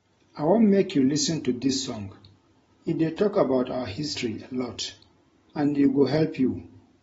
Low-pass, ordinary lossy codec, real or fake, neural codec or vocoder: 14.4 kHz; AAC, 24 kbps; real; none